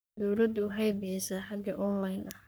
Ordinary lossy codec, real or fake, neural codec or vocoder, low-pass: none; fake; codec, 44.1 kHz, 3.4 kbps, Pupu-Codec; none